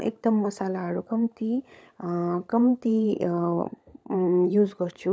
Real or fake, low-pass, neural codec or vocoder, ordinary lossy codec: fake; none; codec, 16 kHz, 16 kbps, FunCodec, trained on LibriTTS, 50 frames a second; none